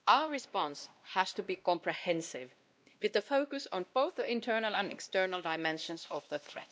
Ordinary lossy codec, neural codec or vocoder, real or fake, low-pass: none; codec, 16 kHz, 1 kbps, X-Codec, WavLM features, trained on Multilingual LibriSpeech; fake; none